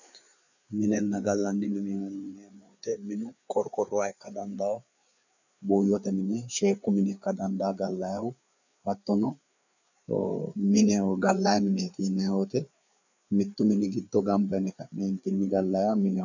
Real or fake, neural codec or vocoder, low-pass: fake; codec, 16 kHz, 4 kbps, FreqCodec, larger model; 7.2 kHz